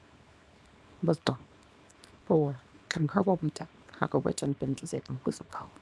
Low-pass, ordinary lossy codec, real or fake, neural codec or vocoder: none; none; fake; codec, 24 kHz, 0.9 kbps, WavTokenizer, small release